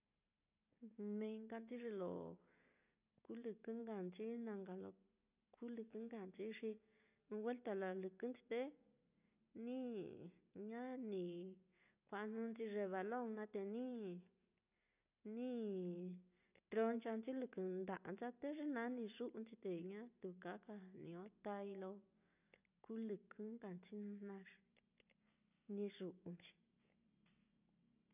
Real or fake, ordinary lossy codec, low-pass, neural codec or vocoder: real; none; 3.6 kHz; none